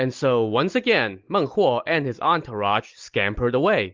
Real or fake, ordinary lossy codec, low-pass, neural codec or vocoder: real; Opus, 32 kbps; 7.2 kHz; none